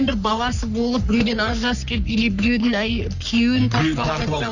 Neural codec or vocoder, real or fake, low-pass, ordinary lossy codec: codec, 44.1 kHz, 3.4 kbps, Pupu-Codec; fake; 7.2 kHz; none